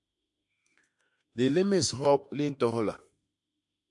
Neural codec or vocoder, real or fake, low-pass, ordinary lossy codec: autoencoder, 48 kHz, 32 numbers a frame, DAC-VAE, trained on Japanese speech; fake; 10.8 kHz; MP3, 64 kbps